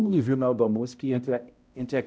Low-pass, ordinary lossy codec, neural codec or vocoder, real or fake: none; none; codec, 16 kHz, 0.5 kbps, X-Codec, HuBERT features, trained on balanced general audio; fake